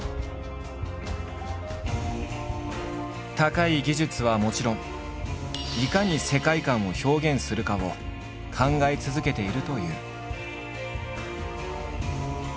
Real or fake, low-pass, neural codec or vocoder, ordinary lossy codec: real; none; none; none